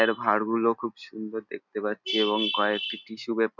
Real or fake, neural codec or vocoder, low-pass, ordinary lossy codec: real; none; 7.2 kHz; none